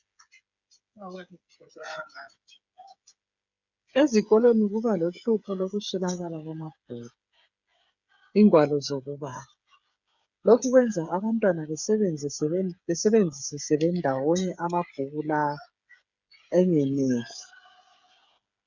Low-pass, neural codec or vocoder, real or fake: 7.2 kHz; codec, 16 kHz, 8 kbps, FreqCodec, smaller model; fake